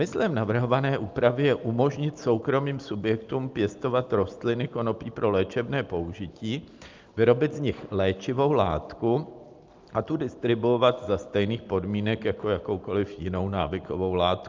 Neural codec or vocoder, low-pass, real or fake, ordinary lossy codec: none; 7.2 kHz; real; Opus, 24 kbps